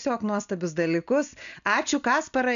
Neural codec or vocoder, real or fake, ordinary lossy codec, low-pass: none; real; MP3, 96 kbps; 7.2 kHz